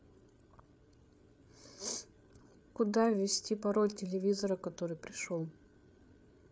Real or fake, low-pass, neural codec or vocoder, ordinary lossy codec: fake; none; codec, 16 kHz, 8 kbps, FreqCodec, larger model; none